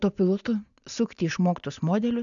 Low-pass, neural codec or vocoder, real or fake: 7.2 kHz; none; real